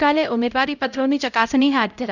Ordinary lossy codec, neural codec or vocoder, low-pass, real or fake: none; codec, 16 kHz, 0.5 kbps, X-Codec, HuBERT features, trained on LibriSpeech; 7.2 kHz; fake